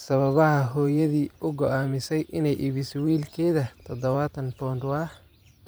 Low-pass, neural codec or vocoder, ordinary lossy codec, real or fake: none; vocoder, 44.1 kHz, 128 mel bands every 512 samples, BigVGAN v2; none; fake